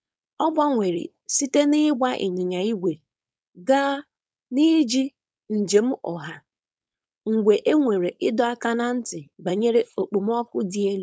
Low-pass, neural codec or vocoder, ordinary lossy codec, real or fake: none; codec, 16 kHz, 4.8 kbps, FACodec; none; fake